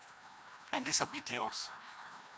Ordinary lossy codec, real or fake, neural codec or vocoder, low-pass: none; fake; codec, 16 kHz, 1 kbps, FreqCodec, larger model; none